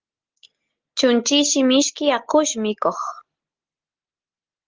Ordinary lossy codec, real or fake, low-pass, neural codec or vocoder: Opus, 16 kbps; real; 7.2 kHz; none